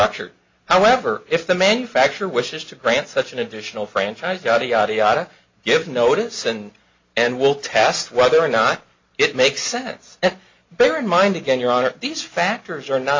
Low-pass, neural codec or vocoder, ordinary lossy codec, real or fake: 7.2 kHz; none; MP3, 48 kbps; real